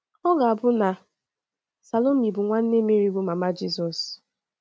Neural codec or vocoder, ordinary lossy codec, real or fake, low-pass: none; none; real; none